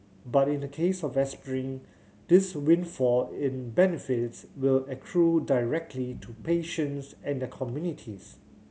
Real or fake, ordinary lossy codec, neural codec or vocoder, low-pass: real; none; none; none